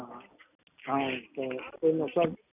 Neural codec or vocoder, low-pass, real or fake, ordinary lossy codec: none; 3.6 kHz; real; none